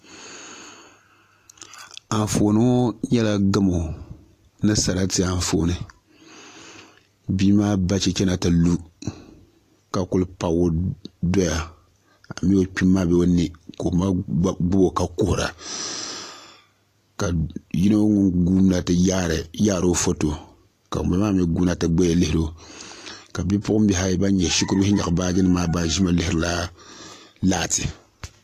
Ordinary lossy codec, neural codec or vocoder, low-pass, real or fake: AAC, 48 kbps; none; 14.4 kHz; real